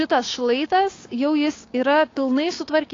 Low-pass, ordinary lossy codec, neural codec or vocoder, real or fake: 7.2 kHz; AAC, 32 kbps; codec, 16 kHz, 6 kbps, DAC; fake